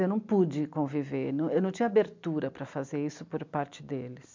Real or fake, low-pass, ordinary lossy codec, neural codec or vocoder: real; 7.2 kHz; MP3, 64 kbps; none